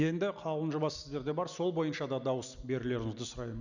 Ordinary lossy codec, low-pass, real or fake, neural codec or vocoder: none; 7.2 kHz; real; none